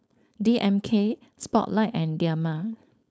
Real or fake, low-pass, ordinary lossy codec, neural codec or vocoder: fake; none; none; codec, 16 kHz, 4.8 kbps, FACodec